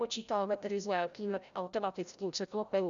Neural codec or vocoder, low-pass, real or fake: codec, 16 kHz, 0.5 kbps, FreqCodec, larger model; 7.2 kHz; fake